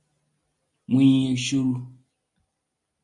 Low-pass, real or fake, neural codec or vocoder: 10.8 kHz; real; none